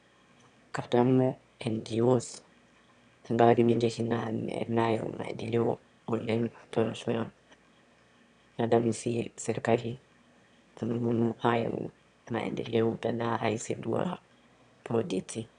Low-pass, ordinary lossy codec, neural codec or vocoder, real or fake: 9.9 kHz; none; autoencoder, 22.05 kHz, a latent of 192 numbers a frame, VITS, trained on one speaker; fake